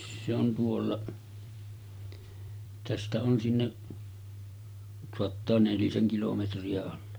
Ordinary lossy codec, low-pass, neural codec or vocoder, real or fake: none; none; vocoder, 44.1 kHz, 128 mel bands every 512 samples, BigVGAN v2; fake